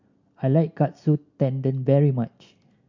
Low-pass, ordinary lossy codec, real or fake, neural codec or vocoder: 7.2 kHz; MP3, 48 kbps; real; none